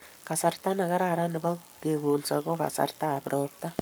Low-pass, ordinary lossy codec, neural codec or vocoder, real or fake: none; none; codec, 44.1 kHz, 7.8 kbps, Pupu-Codec; fake